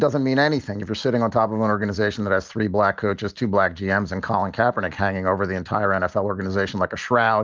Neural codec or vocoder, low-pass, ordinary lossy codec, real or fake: none; 7.2 kHz; Opus, 24 kbps; real